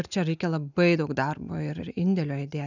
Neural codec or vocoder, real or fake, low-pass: none; real; 7.2 kHz